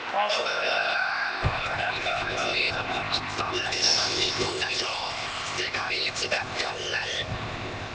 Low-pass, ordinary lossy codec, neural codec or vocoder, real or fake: none; none; codec, 16 kHz, 0.8 kbps, ZipCodec; fake